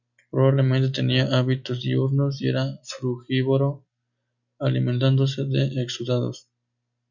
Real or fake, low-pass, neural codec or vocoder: real; 7.2 kHz; none